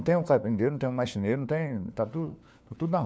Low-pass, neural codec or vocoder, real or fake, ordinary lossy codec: none; codec, 16 kHz, 4 kbps, FunCodec, trained on LibriTTS, 50 frames a second; fake; none